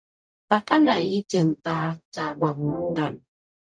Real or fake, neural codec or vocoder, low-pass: fake; codec, 44.1 kHz, 0.9 kbps, DAC; 9.9 kHz